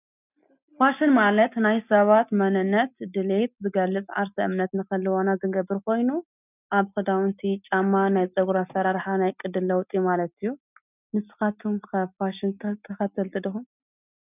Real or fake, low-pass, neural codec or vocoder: real; 3.6 kHz; none